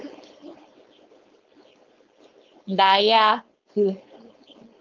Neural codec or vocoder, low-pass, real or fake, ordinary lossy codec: codec, 16 kHz, 4.8 kbps, FACodec; 7.2 kHz; fake; Opus, 32 kbps